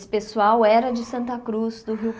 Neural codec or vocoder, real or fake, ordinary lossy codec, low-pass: none; real; none; none